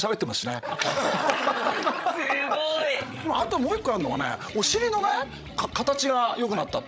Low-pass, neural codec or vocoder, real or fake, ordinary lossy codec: none; codec, 16 kHz, 16 kbps, FreqCodec, larger model; fake; none